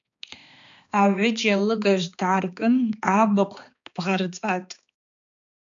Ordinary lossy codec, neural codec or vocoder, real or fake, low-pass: MP3, 64 kbps; codec, 16 kHz, 2 kbps, X-Codec, HuBERT features, trained on balanced general audio; fake; 7.2 kHz